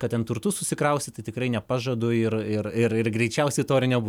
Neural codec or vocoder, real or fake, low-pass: none; real; 19.8 kHz